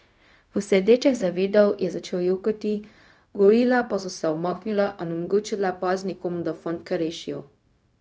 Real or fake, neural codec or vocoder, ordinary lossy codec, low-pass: fake; codec, 16 kHz, 0.4 kbps, LongCat-Audio-Codec; none; none